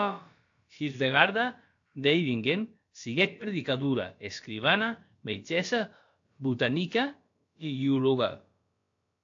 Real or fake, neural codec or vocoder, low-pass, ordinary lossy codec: fake; codec, 16 kHz, about 1 kbps, DyCAST, with the encoder's durations; 7.2 kHz; AAC, 48 kbps